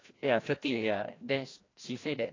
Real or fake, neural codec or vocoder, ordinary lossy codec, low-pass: fake; codec, 16 kHz, 1 kbps, FreqCodec, larger model; AAC, 32 kbps; 7.2 kHz